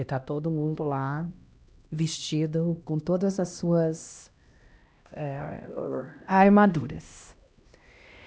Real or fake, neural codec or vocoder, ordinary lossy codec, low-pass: fake; codec, 16 kHz, 1 kbps, X-Codec, HuBERT features, trained on LibriSpeech; none; none